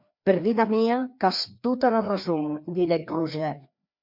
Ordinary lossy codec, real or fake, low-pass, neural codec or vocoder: MP3, 48 kbps; fake; 5.4 kHz; codec, 16 kHz, 2 kbps, FreqCodec, larger model